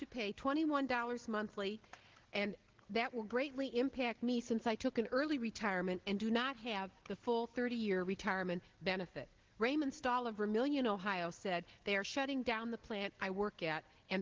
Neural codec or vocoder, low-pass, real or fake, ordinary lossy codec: none; 7.2 kHz; real; Opus, 16 kbps